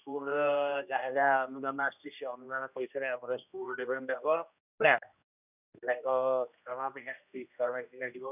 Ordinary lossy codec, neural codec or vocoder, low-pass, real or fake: none; codec, 16 kHz, 1 kbps, X-Codec, HuBERT features, trained on general audio; 3.6 kHz; fake